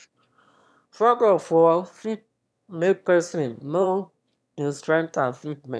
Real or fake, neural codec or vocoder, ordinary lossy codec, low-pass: fake; autoencoder, 22.05 kHz, a latent of 192 numbers a frame, VITS, trained on one speaker; none; none